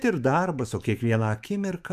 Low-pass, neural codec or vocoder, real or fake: 14.4 kHz; autoencoder, 48 kHz, 128 numbers a frame, DAC-VAE, trained on Japanese speech; fake